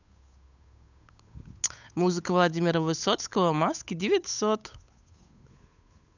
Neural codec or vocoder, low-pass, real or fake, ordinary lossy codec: codec, 16 kHz, 8 kbps, FunCodec, trained on Chinese and English, 25 frames a second; 7.2 kHz; fake; none